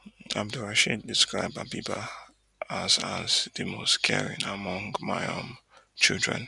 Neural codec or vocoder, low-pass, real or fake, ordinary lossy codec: none; 10.8 kHz; real; none